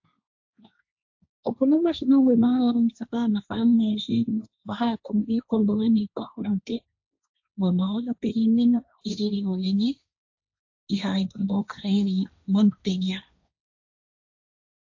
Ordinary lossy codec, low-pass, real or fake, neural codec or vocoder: AAC, 48 kbps; 7.2 kHz; fake; codec, 16 kHz, 1.1 kbps, Voila-Tokenizer